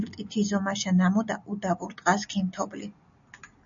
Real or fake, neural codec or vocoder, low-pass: real; none; 7.2 kHz